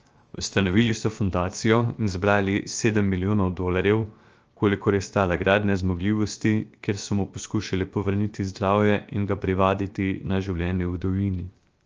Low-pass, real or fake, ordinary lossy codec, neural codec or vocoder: 7.2 kHz; fake; Opus, 24 kbps; codec, 16 kHz, 0.7 kbps, FocalCodec